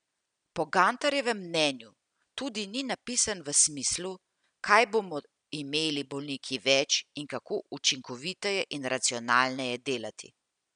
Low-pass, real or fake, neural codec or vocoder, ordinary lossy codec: 9.9 kHz; real; none; none